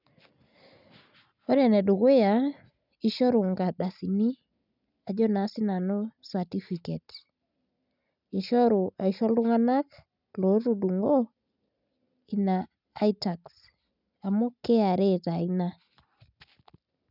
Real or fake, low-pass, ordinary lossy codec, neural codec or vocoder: real; 5.4 kHz; none; none